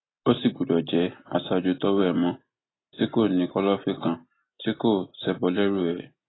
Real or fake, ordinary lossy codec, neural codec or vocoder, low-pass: real; AAC, 16 kbps; none; 7.2 kHz